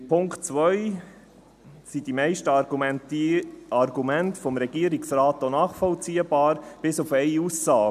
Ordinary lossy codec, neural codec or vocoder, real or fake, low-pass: none; none; real; 14.4 kHz